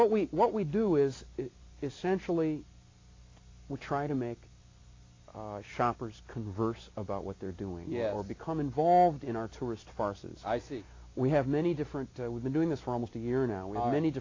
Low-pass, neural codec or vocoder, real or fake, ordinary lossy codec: 7.2 kHz; none; real; AAC, 32 kbps